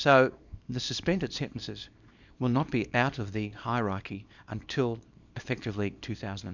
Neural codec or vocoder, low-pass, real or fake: codec, 24 kHz, 0.9 kbps, WavTokenizer, small release; 7.2 kHz; fake